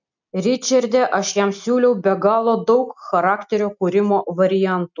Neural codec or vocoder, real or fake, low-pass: none; real; 7.2 kHz